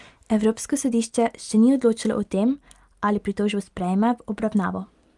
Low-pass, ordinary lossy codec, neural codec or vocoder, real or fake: 10.8 kHz; Opus, 32 kbps; none; real